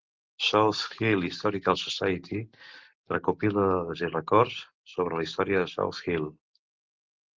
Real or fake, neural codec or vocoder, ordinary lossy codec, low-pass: real; none; Opus, 16 kbps; 7.2 kHz